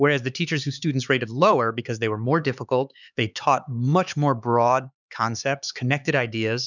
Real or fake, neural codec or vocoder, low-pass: fake; codec, 16 kHz, 4 kbps, X-Codec, HuBERT features, trained on LibriSpeech; 7.2 kHz